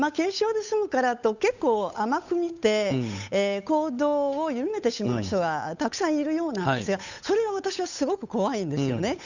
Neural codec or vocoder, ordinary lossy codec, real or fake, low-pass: codec, 16 kHz, 8 kbps, FunCodec, trained on Chinese and English, 25 frames a second; none; fake; 7.2 kHz